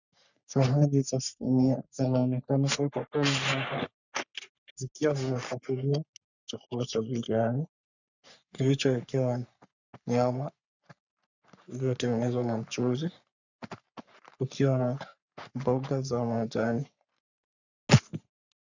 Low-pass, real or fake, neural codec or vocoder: 7.2 kHz; fake; codec, 44.1 kHz, 3.4 kbps, Pupu-Codec